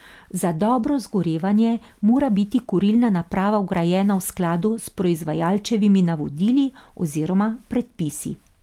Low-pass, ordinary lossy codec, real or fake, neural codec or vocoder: 19.8 kHz; Opus, 32 kbps; real; none